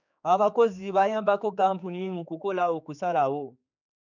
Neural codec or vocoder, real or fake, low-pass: codec, 16 kHz, 4 kbps, X-Codec, HuBERT features, trained on general audio; fake; 7.2 kHz